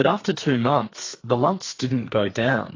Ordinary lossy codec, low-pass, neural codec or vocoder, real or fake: AAC, 32 kbps; 7.2 kHz; codec, 32 kHz, 1.9 kbps, SNAC; fake